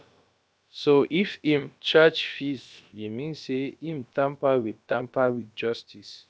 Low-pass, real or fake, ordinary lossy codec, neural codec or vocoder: none; fake; none; codec, 16 kHz, about 1 kbps, DyCAST, with the encoder's durations